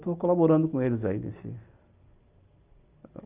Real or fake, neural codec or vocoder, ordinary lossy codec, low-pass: real; none; Opus, 24 kbps; 3.6 kHz